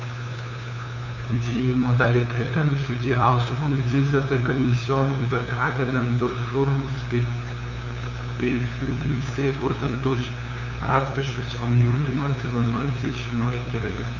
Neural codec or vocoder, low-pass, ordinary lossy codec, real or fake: codec, 16 kHz, 2 kbps, FunCodec, trained on LibriTTS, 25 frames a second; 7.2 kHz; none; fake